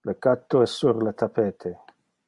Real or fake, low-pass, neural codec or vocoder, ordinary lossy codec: real; 10.8 kHz; none; MP3, 64 kbps